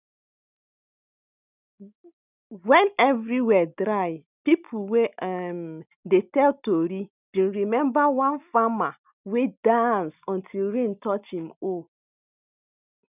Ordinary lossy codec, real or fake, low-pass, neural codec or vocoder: none; real; 3.6 kHz; none